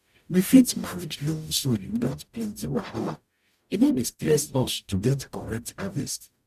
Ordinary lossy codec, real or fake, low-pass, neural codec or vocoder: none; fake; 14.4 kHz; codec, 44.1 kHz, 0.9 kbps, DAC